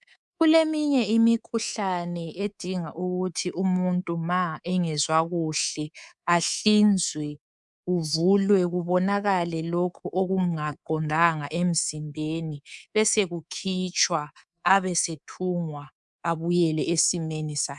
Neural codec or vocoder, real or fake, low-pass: codec, 24 kHz, 3.1 kbps, DualCodec; fake; 10.8 kHz